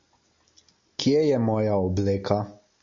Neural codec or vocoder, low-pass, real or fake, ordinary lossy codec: none; 7.2 kHz; real; MP3, 48 kbps